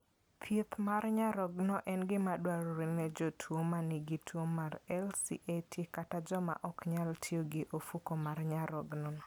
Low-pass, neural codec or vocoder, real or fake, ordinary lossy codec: none; none; real; none